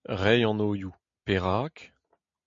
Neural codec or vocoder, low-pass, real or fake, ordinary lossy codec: none; 7.2 kHz; real; MP3, 48 kbps